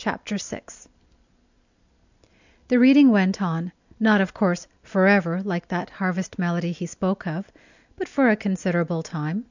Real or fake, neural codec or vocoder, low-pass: real; none; 7.2 kHz